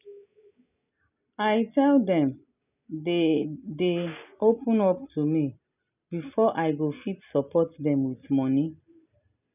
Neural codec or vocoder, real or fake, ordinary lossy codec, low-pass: none; real; none; 3.6 kHz